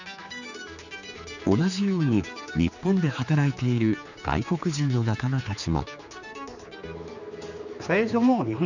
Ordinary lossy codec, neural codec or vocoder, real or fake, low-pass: none; codec, 16 kHz, 4 kbps, X-Codec, HuBERT features, trained on general audio; fake; 7.2 kHz